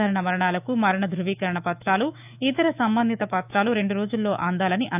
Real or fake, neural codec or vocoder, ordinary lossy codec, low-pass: fake; autoencoder, 48 kHz, 128 numbers a frame, DAC-VAE, trained on Japanese speech; none; 3.6 kHz